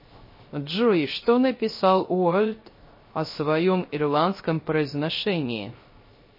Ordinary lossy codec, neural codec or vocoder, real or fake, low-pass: MP3, 24 kbps; codec, 16 kHz, 0.3 kbps, FocalCodec; fake; 5.4 kHz